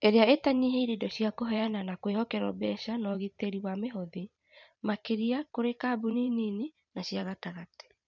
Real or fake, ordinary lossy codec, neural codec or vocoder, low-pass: fake; none; vocoder, 44.1 kHz, 128 mel bands every 512 samples, BigVGAN v2; 7.2 kHz